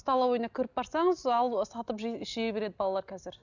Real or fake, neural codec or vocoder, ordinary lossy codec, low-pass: real; none; none; 7.2 kHz